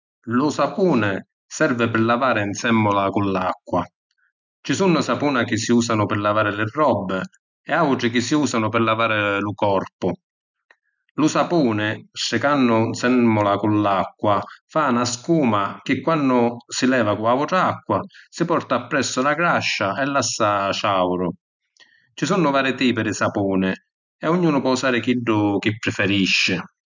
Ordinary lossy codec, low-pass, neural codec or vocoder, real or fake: none; 7.2 kHz; none; real